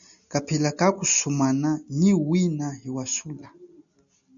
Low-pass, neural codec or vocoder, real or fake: 7.2 kHz; none; real